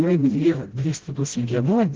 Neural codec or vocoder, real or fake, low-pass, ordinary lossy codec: codec, 16 kHz, 0.5 kbps, FreqCodec, smaller model; fake; 7.2 kHz; Opus, 16 kbps